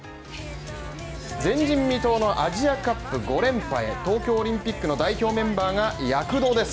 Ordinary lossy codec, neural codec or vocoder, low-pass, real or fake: none; none; none; real